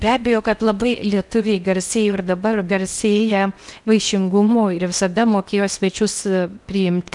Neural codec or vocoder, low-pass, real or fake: codec, 16 kHz in and 24 kHz out, 0.8 kbps, FocalCodec, streaming, 65536 codes; 10.8 kHz; fake